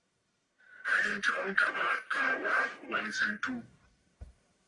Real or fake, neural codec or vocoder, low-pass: fake; codec, 44.1 kHz, 1.7 kbps, Pupu-Codec; 10.8 kHz